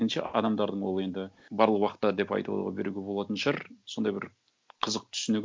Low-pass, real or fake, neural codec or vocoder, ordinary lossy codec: none; real; none; none